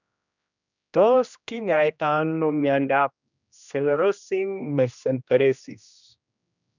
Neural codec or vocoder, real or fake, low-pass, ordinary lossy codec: codec, 16 kHz, 1 kbps, X-Codec, HuBERT features, trained on general audio; fake; 7.2 kHz; none